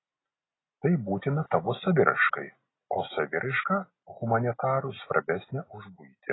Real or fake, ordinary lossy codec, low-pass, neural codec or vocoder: real; AAC, 16 kbps; 7.2 kHz; none